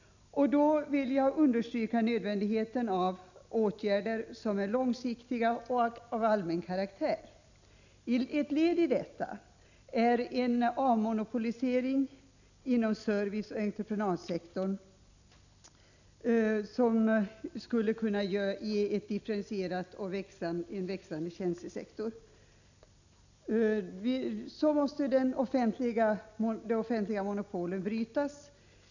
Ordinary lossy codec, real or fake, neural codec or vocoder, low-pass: none; real; none; 7.2 kHz